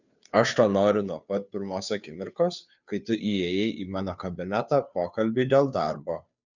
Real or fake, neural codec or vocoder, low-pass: fake; codec, 16 kHz, 2 kbps, FunCodec, trained on Chinese and English, 25 frames a second; 7.2 kHz